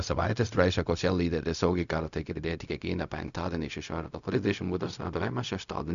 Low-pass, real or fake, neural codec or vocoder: 7.2 kHz; fake; codec, 16 kHz, 0.4 kbps, LongCat-Audio-Codec